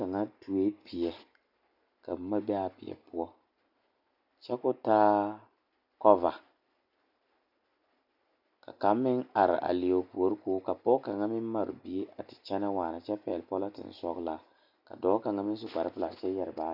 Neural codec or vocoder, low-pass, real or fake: none; 5.4 kHz; real